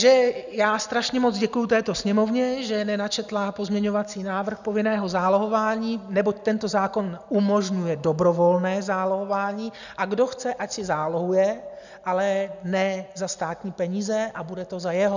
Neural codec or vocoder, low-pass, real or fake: none; 7.2 kHz; real